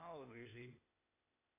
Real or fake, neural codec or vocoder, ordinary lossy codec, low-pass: fake; codec, 16 kHz, 0.8 kbps, ZipCodec; AAC, 32 kbps; 3.6 kHz